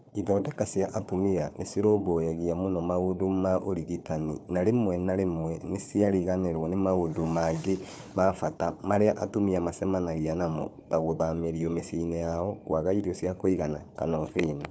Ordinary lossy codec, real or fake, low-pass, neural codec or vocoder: none; fake; none; codec, 16 kHz, 4 kbps, FunCodec, trained on Chinese and English, 50 frames a second